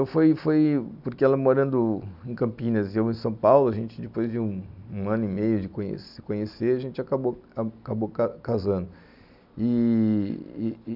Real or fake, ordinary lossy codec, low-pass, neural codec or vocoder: real; none; 5.4 kHz; none